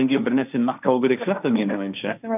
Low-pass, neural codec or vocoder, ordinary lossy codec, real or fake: 3.6 kHz; codec, 16 kHz, 1.1 kbps, Voila-Tokenizer; none; fake